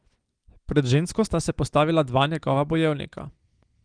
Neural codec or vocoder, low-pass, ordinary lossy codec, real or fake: none; 9.9 kHz; Opus, 24 kbps; real